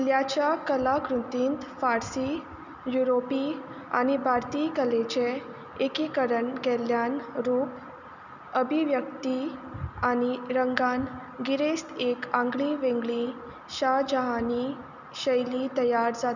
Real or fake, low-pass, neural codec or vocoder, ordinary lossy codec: real; 7.2 kHz; none; none